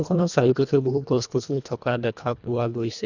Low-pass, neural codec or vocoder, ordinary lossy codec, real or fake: 7.2 kHz; codec, 24 kHz, 1.5 kbps, HILCodec; none; fake